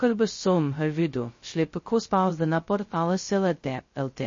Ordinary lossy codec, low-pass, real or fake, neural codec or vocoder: MP3, 32 kbps; 7.2 kHz; fake; codec, 16 kHz, 0.2 kbps, FocalCodec